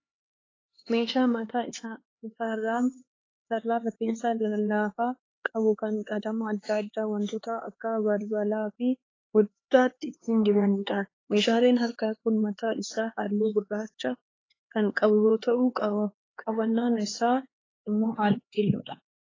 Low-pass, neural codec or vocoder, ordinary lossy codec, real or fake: 7.2 kHz; codec, 16 kHz, 4 kbps, X-Codec, HuBERT features, trained on LibriSpeech; AAC, 32 kbps; fake